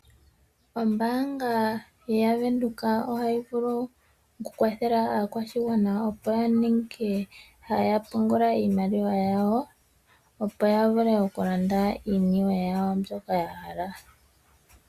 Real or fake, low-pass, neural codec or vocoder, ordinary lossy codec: real; 14.4 kHz; none; Opus, 64 kbps